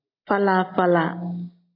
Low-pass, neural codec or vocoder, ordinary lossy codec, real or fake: 5.4 kHz; none; AAC, 32 kbps; real